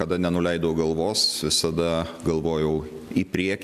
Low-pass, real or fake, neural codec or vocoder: 14.4 kHz; real; none